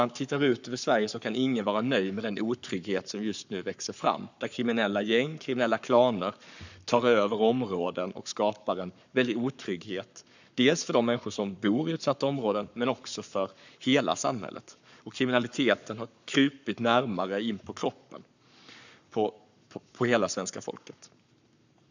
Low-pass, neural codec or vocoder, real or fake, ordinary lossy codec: 7.2 kHz; codec, 44.1 kHz, 7.8 kbps, Pupu-Codec; fake; none